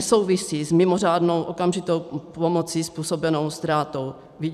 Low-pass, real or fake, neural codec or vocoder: 14.4 kHz; fake; vocoder, 44.1 kHz, 128 mel bands every 256 samples, BigVGAN v2